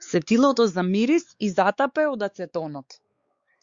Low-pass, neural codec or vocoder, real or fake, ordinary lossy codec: 7.2 kHz; codec, 16 kHz, 4 kbps, X-Codec, WavLM features, trained on Multilingual LibriSpeech; fake; Opus, 64 kbps